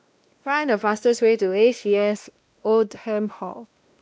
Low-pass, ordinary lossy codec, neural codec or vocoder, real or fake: none; none; codec, 16 kHz, 1 kbps, X-Codec, WavLM features, trained on Multilingual LibriSpeech; fake